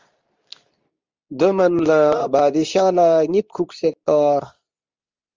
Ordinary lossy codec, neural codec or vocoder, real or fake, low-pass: Opus, 32 kbps; codec, 24 kHz, 0.9 kbps, WavTokenizer, medium speech release version 2; fake; 7.2 kHz